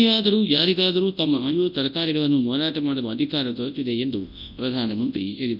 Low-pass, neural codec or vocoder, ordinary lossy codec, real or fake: 5.4 kHz; codec, 24 kHz, 0.9 kbps, WavTokenizer, large speech release; none; fake